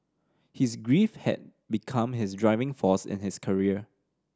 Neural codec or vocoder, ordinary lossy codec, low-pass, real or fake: none; none; none; real